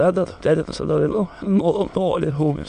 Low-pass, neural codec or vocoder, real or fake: 9.9 kHz; autoencoder, 22.05 kHz, a latent of 192 numbers a frame, VITS, trained on many speakers; fake